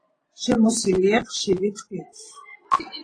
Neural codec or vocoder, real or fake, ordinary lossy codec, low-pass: vocoder, 24 kHz, 100 mel bands, Vocos; fake; AAC, 32 kbps; 9.9 kHz